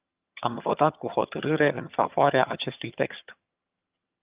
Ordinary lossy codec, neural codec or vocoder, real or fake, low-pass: Opus, 24 kbps; vocoder, 22.05 kHz, 80 mel bands, HiFi-GAN; fake; 3.6 kHz